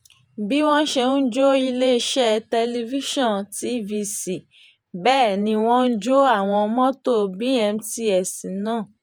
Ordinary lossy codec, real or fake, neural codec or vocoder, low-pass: none; fake; vocoder, 48 kHz, 128 mel bands, Vocos; none